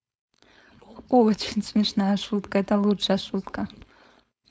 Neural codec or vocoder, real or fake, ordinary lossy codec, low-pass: codec, 16 kHz, 4.8 kbps, FACodec; fake; none; none